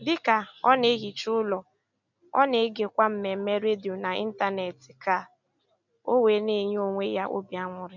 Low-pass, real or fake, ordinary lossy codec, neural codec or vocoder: 7.2 kHz; real; none; none